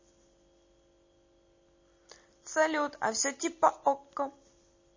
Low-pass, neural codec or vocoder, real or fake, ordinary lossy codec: 7.2 kHz; none; real; MP3, 32 kbps